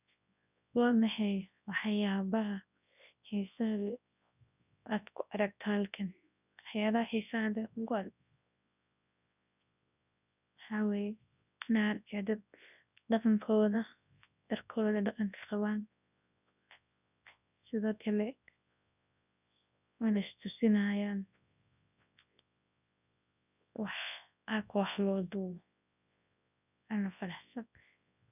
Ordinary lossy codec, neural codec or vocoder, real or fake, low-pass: none; codec, 24 kHz, 0.9 kbps, WavTokenizer, large speech release; fake; 3.6 kHz